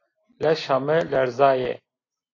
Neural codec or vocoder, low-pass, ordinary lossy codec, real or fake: none; 7.2 kHz; AAC, 32 kbps; real